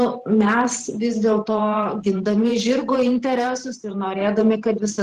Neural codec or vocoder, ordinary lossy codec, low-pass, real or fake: vocoder, 44.1 kHz, 128 mel bands every 512 samples, BigVGAN v2; Opus, 16 kbps; 14.4 kHz; fake